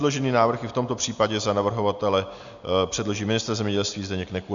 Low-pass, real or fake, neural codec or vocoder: 7.2 kHz; real; none